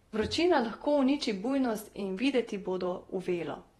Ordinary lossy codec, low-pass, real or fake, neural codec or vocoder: AAC, 32 kbps; 19.8 kHz; fake; vocoder, 48 kHz, 128 mel bands, Vocos